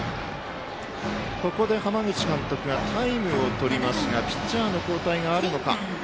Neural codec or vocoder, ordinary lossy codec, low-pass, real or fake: none; none; none; real